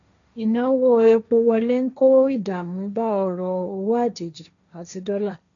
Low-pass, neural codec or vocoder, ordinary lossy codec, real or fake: 7.2 kHz; codec, 16 kHz, 1.1 kbps, Voila-Tokenizer; none; fake